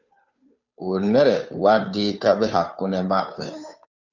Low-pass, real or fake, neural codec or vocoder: 7.2 kHz; fake; codec, 16 kHz, 2 kbps, FunCodec, trained on Chinese and English, 25 frames a second